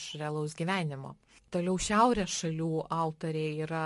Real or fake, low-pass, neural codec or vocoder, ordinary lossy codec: real; 14.4 kHz; none; MP3, 48 kbps